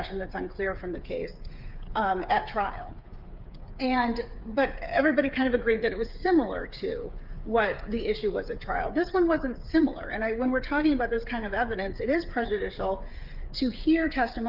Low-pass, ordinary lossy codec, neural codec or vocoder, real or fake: 5.4 kHz; Opus, 24 kbps; codec, 16 kHz, 8 kbps, FreqCodec, smaller model; fake